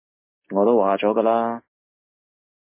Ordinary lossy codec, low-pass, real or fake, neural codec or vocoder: MP3, 24 kbps; 3.6 kHz; real; none